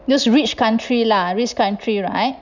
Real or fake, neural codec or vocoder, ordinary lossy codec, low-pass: real; none; none; 7.2 kHz